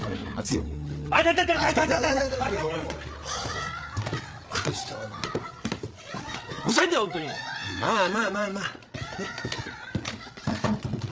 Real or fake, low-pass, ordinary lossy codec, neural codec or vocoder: fake; none; none; codec, 16 kHz, 8 kbps, FreqCodec, larger model